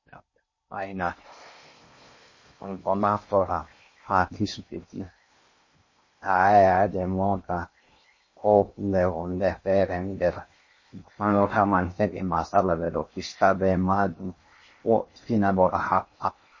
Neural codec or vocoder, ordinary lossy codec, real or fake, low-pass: codec, 16 kHz in and 24 kHz out, 0.6 kbps, FocalCodec, streaming, 4096 codes; MP3, 32 kbps; fake; 7.2 kHz